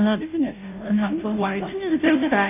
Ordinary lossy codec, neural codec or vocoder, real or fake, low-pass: none; codec, 16 kHz, 0.5 kbps, FunCodec, trained on Chinese and English, 25 frames a second; fake; 3.6 kHz